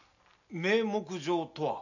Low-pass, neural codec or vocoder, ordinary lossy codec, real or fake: 7.2 kHz; none; none; real